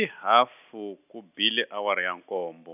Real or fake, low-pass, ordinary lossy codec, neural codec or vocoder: real; 3.6 kHz; none; none